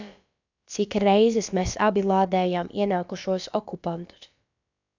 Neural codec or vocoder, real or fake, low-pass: codec, 16 kHz, about 1 kbps, DyCAST, with the encoder's durations; fake; 7.2 kHz